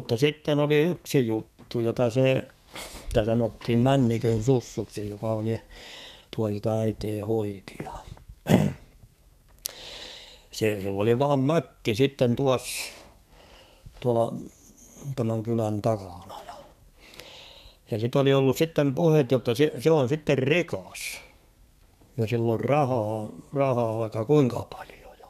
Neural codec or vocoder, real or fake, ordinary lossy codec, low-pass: codec, 32 kHz, 1.9 kbps, SNAC; fake; none; 14.4 kHz